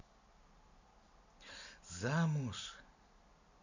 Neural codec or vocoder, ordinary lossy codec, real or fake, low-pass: none; none; real; 7.2 kHz